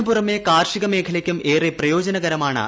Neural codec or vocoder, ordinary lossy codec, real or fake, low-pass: none; none; real; none